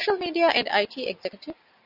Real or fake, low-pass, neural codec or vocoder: real; 5.4 kHz; none